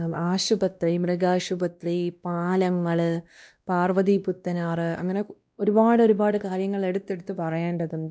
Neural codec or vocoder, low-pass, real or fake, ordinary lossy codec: codec, 16 kHz, 1 kbps, X-Codec, WavLM features, trained on Multilingual LibriSpeech; none; fake; none